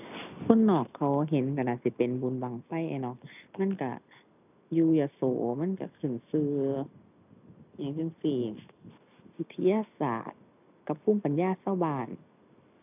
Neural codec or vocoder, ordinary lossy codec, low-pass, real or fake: none; none; 3.6 kHz; real